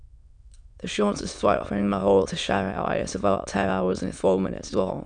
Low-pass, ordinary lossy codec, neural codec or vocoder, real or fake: 9.9 kHz; none; autoencoder, 22.05 kHz, a latent of 192 numbers a frame, VITS, trained on many speakers; fake